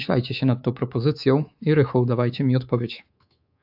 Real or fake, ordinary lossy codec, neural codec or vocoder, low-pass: fake; AAC, 48 kbps; codec, 24 kHz, 3.1 kbps, DualCodec; 5.4 kHz